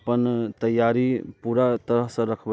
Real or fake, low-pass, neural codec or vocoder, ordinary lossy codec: real; none; none; none